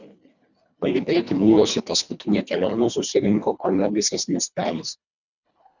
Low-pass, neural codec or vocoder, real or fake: 7.2 kHz; codec, 24 kHz, 1.5 kbps, HILCodec; fake